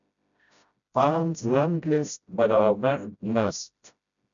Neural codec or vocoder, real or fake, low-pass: codec, 16 kHz, 0.5 kbps, FreqCodec, smaller model; fake; 7.2 kHz